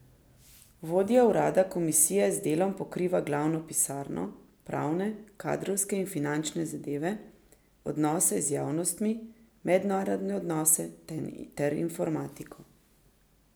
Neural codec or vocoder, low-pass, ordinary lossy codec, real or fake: none; none; none; real